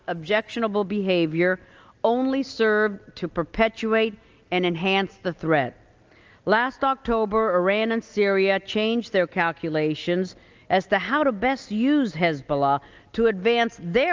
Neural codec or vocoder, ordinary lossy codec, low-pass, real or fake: none; Opus, 24 kbps; 7.2 kHz; real